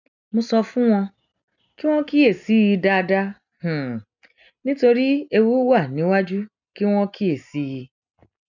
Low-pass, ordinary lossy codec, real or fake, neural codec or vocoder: 7.2 kHz; none; real; none